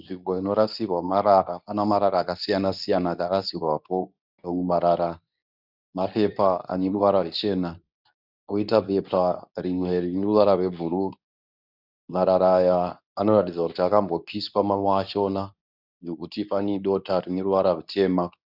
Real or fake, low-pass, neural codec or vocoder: fake; 5.4 kHz; codec, 24 kHz, 0.9 kbps, WavTokenizer, medium speech release version 2